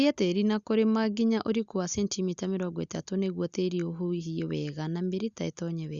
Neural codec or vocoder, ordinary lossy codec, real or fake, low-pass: none; Opus, 64 kbps; real; 7.2 kHz